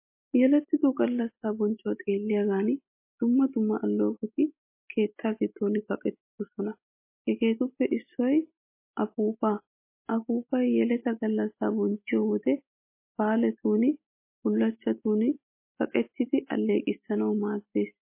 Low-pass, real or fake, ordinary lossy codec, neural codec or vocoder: 3.6 kHz; real; MP3, 24 kbps; none